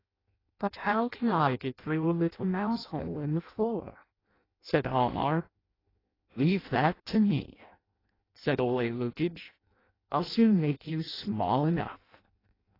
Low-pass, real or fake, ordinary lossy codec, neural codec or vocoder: 5.4 kHz; fake; AAC, 24 kbps; codec, 16 kHz in and 24 kHz out, 0.6 kbps, FireRedTTS-2 codec